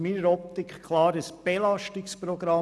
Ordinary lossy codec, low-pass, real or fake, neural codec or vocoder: none; none; real; none